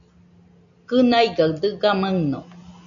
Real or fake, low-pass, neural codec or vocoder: real; 7.2 kHz; none